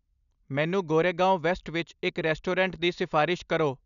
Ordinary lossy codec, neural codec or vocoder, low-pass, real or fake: none; none; 7.2 kHz; real